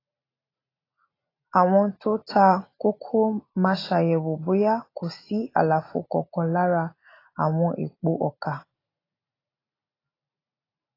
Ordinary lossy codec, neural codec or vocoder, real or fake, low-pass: AAC, 24 kbps; none; real; 5.4 kHz